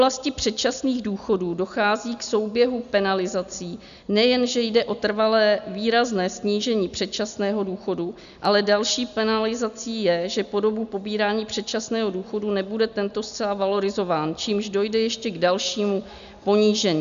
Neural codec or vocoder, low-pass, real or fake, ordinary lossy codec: none; 7.2 kHz; real; AAC, 96 kbps